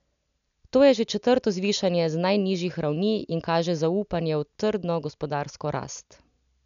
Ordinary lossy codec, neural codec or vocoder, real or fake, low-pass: none; none; real; 7.2 kHz